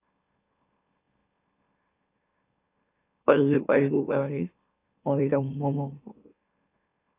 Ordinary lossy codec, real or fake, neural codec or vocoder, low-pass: AAC, 24 kbps; fake; autoencoder, 44.1 kHz, a latent of 192 numbers a frame, MeloTTS; 3.6 kHz